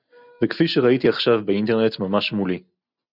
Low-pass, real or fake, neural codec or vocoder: 5.4 kHz; real; none